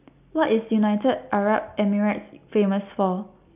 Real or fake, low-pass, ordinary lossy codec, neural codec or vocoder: real; 3.6 kHz; none; none